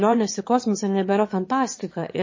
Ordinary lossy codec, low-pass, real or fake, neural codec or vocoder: MP3, 32 kbps; 7.2 kHz; fake; autoencoder, 22.05 kHz, a latent of 192 numbers a frame, VITS, trained on one speaker